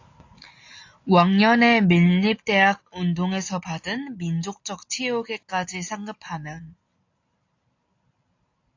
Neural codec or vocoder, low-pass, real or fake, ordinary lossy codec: none; 7.2 kHz; real; AAC, 48 kbps